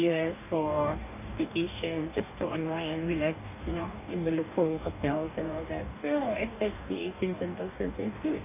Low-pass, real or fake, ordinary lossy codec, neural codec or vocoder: 3.6 kHz; fake; none; codec, 44.1 kHz, 2.6 kbps, DAC